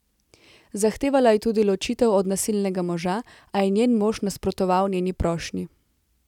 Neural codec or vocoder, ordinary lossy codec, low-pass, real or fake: none; none; 19.8 kHz; real